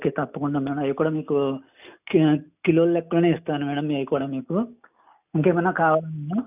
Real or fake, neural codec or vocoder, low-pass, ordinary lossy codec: real; none; 3.6 kHz; none